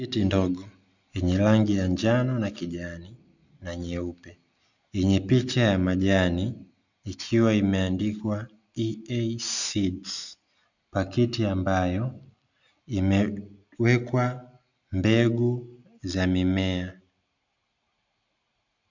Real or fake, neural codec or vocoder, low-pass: real; none; 7.2 kHz